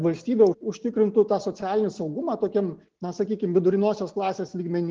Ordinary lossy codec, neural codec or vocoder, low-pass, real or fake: Opus, 16 kbps; none; 7.2 kHz; real